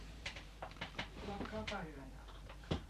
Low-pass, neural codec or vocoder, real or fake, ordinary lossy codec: 14.4 kHz; vocoder, 44.1 kHz, 128 mel bands every 512 samples, BigVGAN v2; fake; none